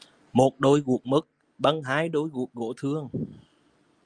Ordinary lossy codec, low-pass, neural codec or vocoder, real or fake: Opus, 32 kbps; 9.9 kHz; none; real